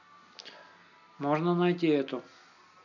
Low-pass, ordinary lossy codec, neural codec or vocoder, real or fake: 7.2 kHz; none; none; real